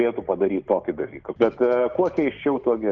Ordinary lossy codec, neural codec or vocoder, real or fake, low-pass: Opus, 24 kbps; none; real; 7.2 kHz